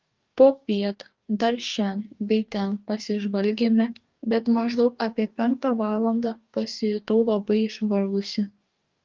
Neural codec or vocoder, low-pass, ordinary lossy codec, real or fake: codec, 44.1 kHz, 2.6 kbps, DAC; 7.2 kHz; Opus, 32 kbps; fake